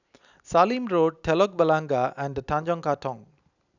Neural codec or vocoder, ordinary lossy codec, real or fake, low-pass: none; none; real; 7.2 kHz